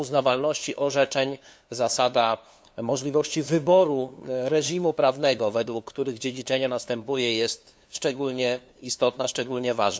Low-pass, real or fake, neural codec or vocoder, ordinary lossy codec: none; fake; codec, 16 kHz, 2 kbps, FunCodec, trained on LibriTTS, 25 frames a second; none